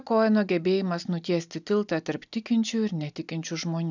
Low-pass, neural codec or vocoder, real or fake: 7.2 kHz; none; real